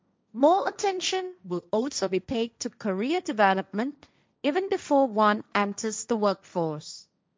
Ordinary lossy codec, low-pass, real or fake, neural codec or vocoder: none; none; fake; codec, 16 kHz, 1.1 kbps, Voila-Tokenizer